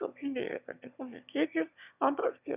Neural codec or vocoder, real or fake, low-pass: autoencoder, 22.05 kHz, a latent of 192 numbers a frame, VITS, trained on one speaker; fake; 3.6 kHz